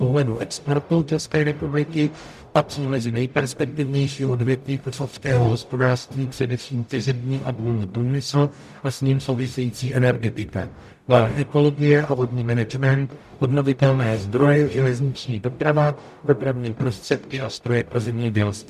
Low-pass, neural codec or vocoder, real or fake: 14.4 kHz; codec, 44.1 kHz, 0.9 kbps, DAC; fake